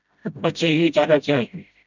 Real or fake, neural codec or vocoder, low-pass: fake; codec, 16 kHz, 0.5 kbps, FreqCodec, smaller model; 7.2 kHz